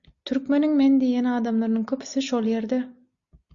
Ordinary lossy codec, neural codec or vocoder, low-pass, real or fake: Opus, 64 kbps; none; 7.2 kHz; real